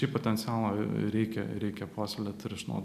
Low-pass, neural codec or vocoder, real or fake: 14.4 kHz; none; real